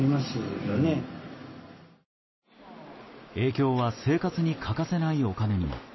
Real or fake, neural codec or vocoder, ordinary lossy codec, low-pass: real; none; MP3, 24 kbps; 7.2 kHz